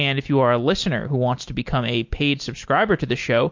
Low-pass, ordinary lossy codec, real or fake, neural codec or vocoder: 7.2 kHz; MP3, 48 kbps; real; none